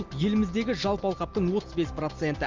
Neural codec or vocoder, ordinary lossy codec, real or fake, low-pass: none; Opus, 24 kbps; real; 7.2 kHz